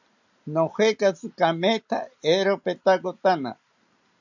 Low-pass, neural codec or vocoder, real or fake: 7.2 kHz; none; real